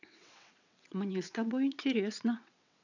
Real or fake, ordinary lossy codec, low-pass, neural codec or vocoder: fake; none; 7.2 kHz; vocoder, 22.05 kHz, 80 mel bands, WaveNeXt